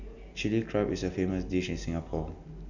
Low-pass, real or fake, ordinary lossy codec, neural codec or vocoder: 7.2 kHz; real; none; none